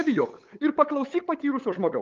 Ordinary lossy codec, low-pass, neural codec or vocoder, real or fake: Opus, 32 kbps; 7.2 kHz; codec, 16 kHz, 8 kbps, FunCodec, trained on LibriTTS, 25 frames a second; fake